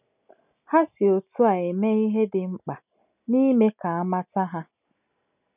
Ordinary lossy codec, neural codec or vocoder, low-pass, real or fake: none; none; 3.6 kHz; real